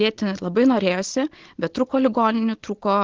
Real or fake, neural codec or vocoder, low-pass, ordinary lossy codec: real; none; 7.2 kHz; Opus, 16 kbps